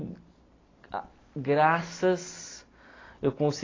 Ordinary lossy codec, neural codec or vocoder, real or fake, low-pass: AAC, 32 kbps; none; real; 7.2 kHz